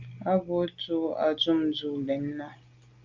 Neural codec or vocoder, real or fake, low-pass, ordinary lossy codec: none; real; 7.2 kHz; Opus, 24 kbps